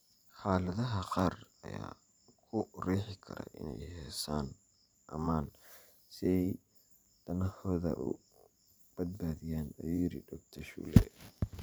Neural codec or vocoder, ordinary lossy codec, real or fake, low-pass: vocoder, 44.1 kHz, 128 mel bands every 512 samples, BigVGAN v2; none; fake; none